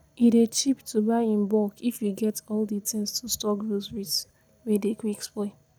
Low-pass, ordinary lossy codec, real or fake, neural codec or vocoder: none; none; real; none